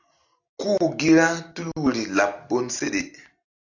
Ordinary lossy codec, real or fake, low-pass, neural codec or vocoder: AAC, 48 kbps; real; 7.2 kHz; none